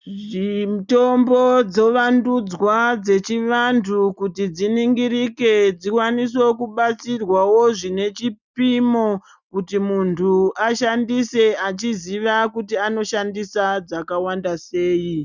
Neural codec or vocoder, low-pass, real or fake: none; 7.2 kHz; real